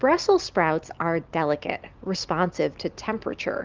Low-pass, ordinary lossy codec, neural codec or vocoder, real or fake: 7.2 kHz; Opus, 24 kbps; none; real